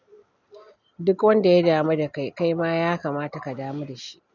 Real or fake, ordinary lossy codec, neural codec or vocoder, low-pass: real; none; none; 7.2 kHz